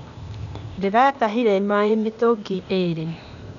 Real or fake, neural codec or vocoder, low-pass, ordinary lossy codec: fake; codec, 16 kHz, 0.8 kbps, ZipCodec; 7.2 kHz; none